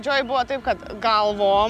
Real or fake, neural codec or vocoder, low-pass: real; none; 14.4 kHz